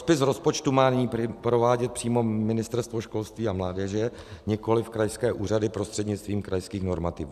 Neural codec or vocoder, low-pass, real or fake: none; 14.4 kHz; real